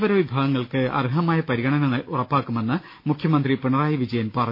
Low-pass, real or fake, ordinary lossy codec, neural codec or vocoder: 5.4 kHz; real; MP3, 32 kbps; none